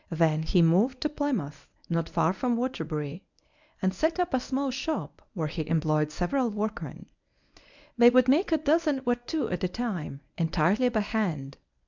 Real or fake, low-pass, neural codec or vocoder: fake; 7.2 kHz; codec, 24 kHz, 0.9 kbps, WavTokenizer, medium speech release version 1